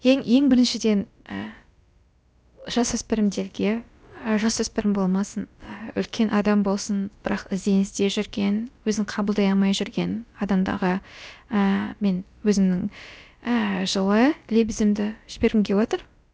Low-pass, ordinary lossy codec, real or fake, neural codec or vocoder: none; none; fake; codec, 16 kHz, about 1 kbps, DyCAST, with the encoder's durations